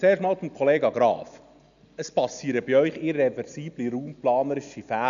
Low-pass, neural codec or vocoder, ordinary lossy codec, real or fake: 7.2 kHz; none; none; real